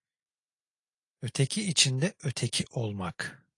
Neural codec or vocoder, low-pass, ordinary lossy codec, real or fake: none; 10.8 kHz; AAC, 48 kbps; real